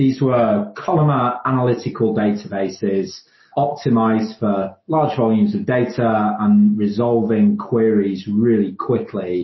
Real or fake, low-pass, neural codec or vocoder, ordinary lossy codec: real; 7.2 kHz; none; MP3, 24 kbps